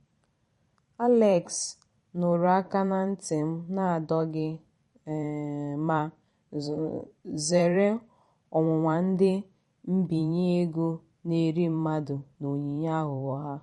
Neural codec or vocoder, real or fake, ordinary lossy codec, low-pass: vocoder, 44.1 kHz, 128 mel bands every 512 samples, BigVGAN v2; fake; MP3, 48 kbps; 19.8 kHz